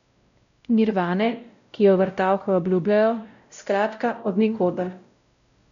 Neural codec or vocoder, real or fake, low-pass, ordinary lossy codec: codec, 16 kHz, 0.5 kbps, X-Codec, WavLM features, trained on Multilingual LibriSpeech; fake; 7.2 kHz; none